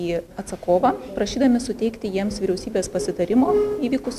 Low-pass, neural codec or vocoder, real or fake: 14.4 kHz; none; real